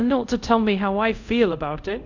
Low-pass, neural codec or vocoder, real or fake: 7.2 kHz; codec, 24 kHz, 0.5 kbps, DualCodec; fake